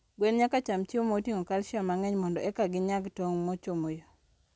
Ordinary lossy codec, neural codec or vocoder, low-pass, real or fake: none; none; none; real